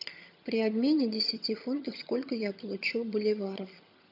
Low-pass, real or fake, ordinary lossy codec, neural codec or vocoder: 5.4 kHz; fake; AAC, 48 kbps; vocoder, 22.05 kHz, 80 mel bands, HiFi-GAN